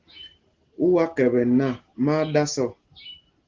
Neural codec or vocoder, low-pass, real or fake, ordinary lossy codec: none; 7.2 kHz; real; Opus, 16 kbps